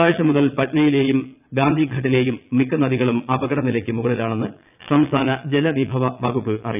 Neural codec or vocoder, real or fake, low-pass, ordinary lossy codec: vocoder, 22.05 kHz, 80 mel bands, Vocos; fake; 3.6 kHz; none